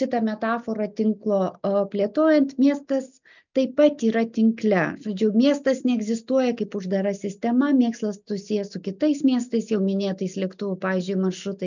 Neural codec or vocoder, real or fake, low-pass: none; real; 7.2 kHz